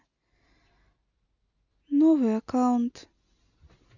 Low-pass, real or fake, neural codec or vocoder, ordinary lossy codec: 7.2 kHz; real; none; none